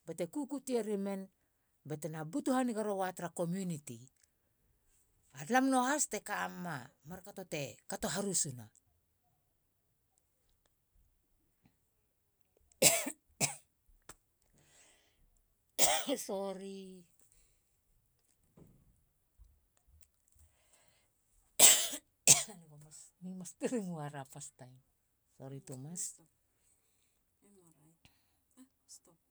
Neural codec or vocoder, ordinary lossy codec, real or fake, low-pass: none; none; real; none